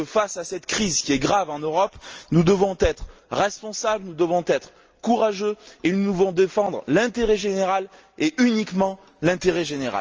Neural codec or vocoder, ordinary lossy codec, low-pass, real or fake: none; Opus, 24 kbps; 7.2 kHz; real